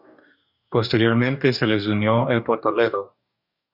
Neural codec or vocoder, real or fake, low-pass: codec, 44.1 kHz, 2.6 kbps, DAC; fake; 5.4 kHz